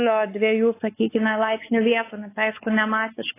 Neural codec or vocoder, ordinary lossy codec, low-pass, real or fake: codec, 16 kHz, 4 kbps, X-Codec, WavLM features, trained on Multilingual LibriSpeech; AAC, 16 kbps; 3.6 kHz; fake